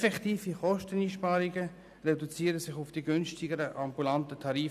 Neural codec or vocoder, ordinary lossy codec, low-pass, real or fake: none; none; 14.4 kHz; real